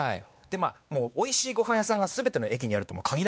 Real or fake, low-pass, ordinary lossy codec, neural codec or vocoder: fake; none; none; codec, 16 kHz, 2 kbps, X-Codec, WavLM features, trained on Multilingual LibriSpeech